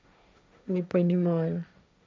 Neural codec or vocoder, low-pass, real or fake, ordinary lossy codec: codec, 16 kHz, 1.1 kbps, Voila-Tokenizer; none; fake; none